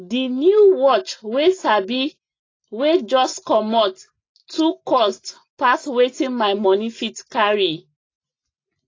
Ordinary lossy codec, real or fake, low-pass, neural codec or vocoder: AAC, 32 kbps; real; 7.2 kHz; none